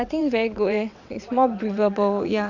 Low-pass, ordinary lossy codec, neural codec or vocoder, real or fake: 7.2 kHz; none; vocoder, 22.05 kHz, 80 mel bands, WaveNeXt; fake